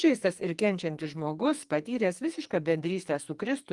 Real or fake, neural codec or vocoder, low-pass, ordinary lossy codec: fake; codec, 44.1 kHz, 2.6 kbps, SNAC; 10.8 kHz; Opus, 24 kbps